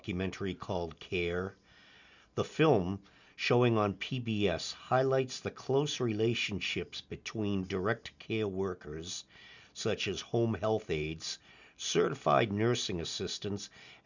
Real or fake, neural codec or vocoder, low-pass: real; none; 7.2 kHz